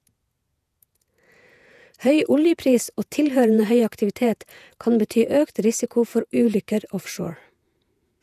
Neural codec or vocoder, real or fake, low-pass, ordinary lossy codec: vocoder, 48 kHz, 128 mel bands, Vocos; fake; 14.4 kHz; none